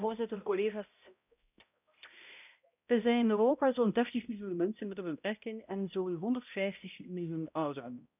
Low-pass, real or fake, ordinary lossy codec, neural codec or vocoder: 3.6 kHz; fake; none; codec, 16 kHz, 0.5 kbps, X-Codec, HuBERT features, trained on balanced general audio